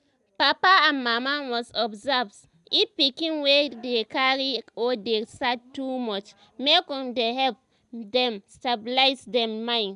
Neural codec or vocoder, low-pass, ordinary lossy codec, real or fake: none; 10.8 kHz; none; real